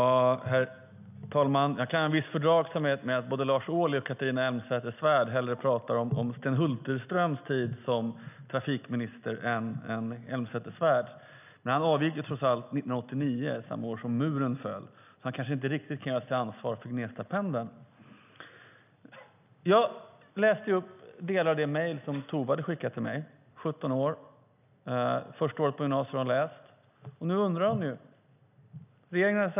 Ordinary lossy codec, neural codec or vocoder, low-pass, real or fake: none; none; 3.6 kHz; real